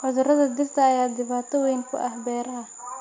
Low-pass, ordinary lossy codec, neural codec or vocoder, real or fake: 7.2 kHz; MP3, 48 kbps; none; real